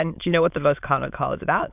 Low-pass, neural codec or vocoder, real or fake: 3.6 kHz; autoencoder, 22.05 kHz, a latent of 192 numbers a frame, VITS, trained on many speakers; fake